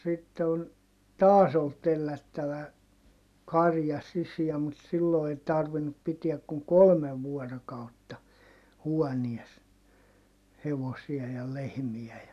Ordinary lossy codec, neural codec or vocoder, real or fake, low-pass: none; none; real; 14.4 kHz